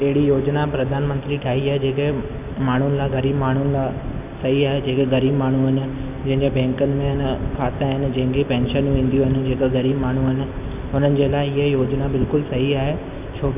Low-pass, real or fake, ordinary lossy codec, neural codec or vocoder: 3.6 kHz; real; none; none